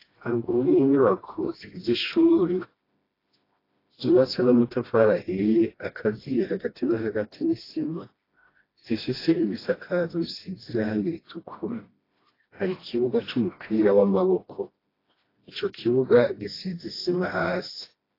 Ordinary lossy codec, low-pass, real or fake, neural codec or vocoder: AAC, 24 kbps; 5.4 kHz; fake; codec, 16 kHz, 1 kbps, FreqCodec, smaller model